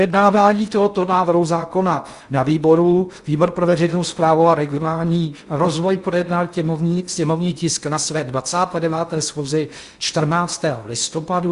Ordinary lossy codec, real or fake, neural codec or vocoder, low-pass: Opus, 64 kbps; fake; codec, 16 kHz in and 24 kHz out, 0.6 kbps, FocalCodec, streaming, 4096 codes; 10.8 kHz